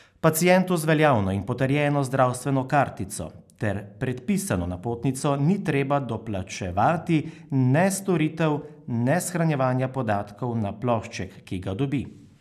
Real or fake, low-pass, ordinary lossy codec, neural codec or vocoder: real; 14.4 kHz; none; none